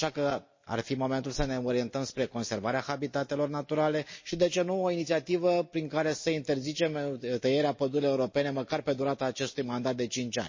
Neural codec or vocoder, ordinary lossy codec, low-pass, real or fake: none; MP3, 32 kbps; 7.2 kHz; real